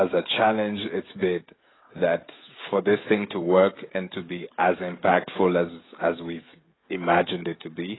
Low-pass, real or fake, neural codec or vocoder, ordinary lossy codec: 7.2 kHz; fake; codec, 16 kHz, 16 kbps, FreqCodec, smaller model; AAC, 16 kbps